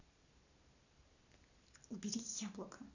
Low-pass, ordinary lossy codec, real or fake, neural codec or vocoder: 7.2 kHz; none; real; none